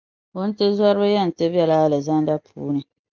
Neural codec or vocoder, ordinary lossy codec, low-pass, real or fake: none; Opus, 24 kbps; 7.2 kHz; real